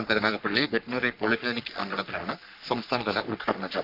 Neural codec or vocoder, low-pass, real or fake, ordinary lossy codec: codec, 44.1 kHz, 3.4 kbps, Pupu-Codec; 5.4 kHz; fake; none